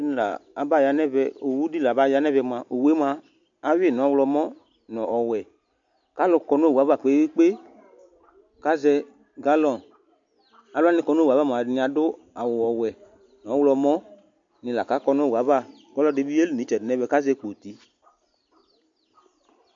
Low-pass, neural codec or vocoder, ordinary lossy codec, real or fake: 7.2 kHz; none; MP3, 48 kbps; real